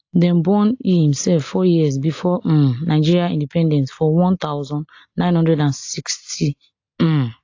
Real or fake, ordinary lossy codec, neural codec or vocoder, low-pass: real; AAC, 48 kbps; none; 7.2 kHz